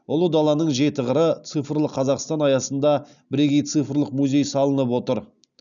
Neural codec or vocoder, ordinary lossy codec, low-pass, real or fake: none; none; 7.2 kHz; real